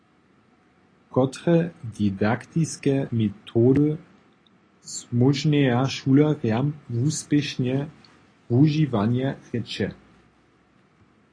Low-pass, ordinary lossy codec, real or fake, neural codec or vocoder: 9.9 kHz; AAC, 32 kbps; real; none